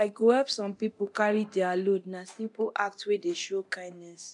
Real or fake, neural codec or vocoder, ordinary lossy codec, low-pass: fake; codec, 24 kHz, 0.9 kbps, DualCodec; none; 10.8 kHz